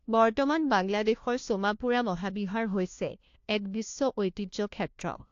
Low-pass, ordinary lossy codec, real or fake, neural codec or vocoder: 7.2 kHz; AAC, 48 kbps; fake; codec, 16 kHz, 1 kbps, FunCodec, trained on LibriTTS, 50 frames a second